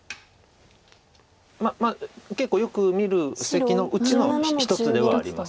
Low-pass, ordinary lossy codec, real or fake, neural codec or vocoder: none; none; real; none